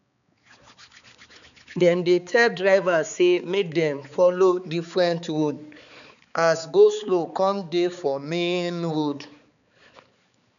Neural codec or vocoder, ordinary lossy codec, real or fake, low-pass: codec, 16 kHz, 4 kbps, X-Codec, HuBERT features, trained on balanced general audio; none; fake; 7.2 kHz